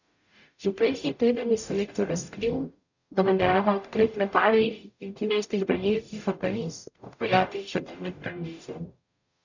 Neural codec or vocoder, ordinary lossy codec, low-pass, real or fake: codec, 44.1 kHz, 0.9 kbps, DAC; none; 7.2 kHz; fake